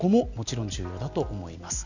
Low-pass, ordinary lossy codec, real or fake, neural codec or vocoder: 7.2 kHz; none; real; none